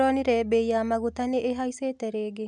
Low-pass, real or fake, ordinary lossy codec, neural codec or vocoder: 10.8 kHz; real; none; none